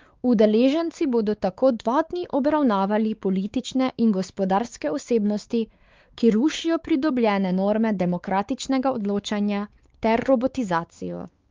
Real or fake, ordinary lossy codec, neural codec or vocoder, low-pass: fake; Opus, 32 kbps; codec, 16 kHz, 4 kbps, X-Codec, WavLM features, trained on Multilingual LibriSpeech; 7.2 kHz